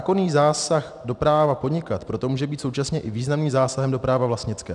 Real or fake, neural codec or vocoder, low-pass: real; none; 10.8 kHz